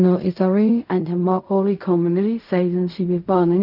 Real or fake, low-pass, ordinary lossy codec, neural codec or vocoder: fake; 5.4 kHz; none; codec, 16 kHz in and 24 kHz out, 0.4 kbps, LongCat-Audio-Codec, fine tuned four codebook decoder